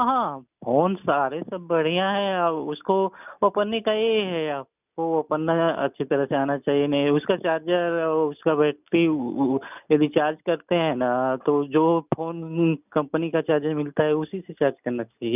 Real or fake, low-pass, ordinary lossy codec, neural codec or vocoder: real; 3.6 kHz; none; none